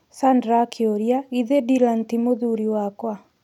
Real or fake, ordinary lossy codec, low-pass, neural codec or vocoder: real; none; 19.8 kHz; none